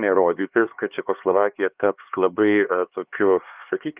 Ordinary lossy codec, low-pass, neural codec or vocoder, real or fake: Opus, 24 kbps; 3.6 kHz; codec, 16 kHz, 2 kbps, X-Codec, HuBERT features, trained on LibriSpeech; fake